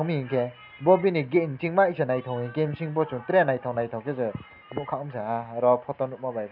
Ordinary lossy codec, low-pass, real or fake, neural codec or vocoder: none; 5.4 kHz; real; none